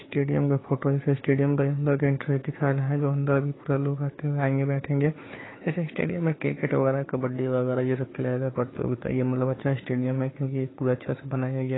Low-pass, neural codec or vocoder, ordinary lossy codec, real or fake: 7.2 kHz; codec, 16 kHz, 4 kbps, FunCodec, trained on Chinese and English, 50 frames a second; AAC, 16 kbps; fake